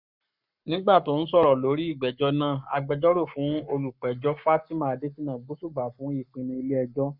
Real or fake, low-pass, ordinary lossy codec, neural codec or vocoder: fake; 5.4 kHz; none; codec, 44.1 kHz, 7.8 kbps, Pupu-Codec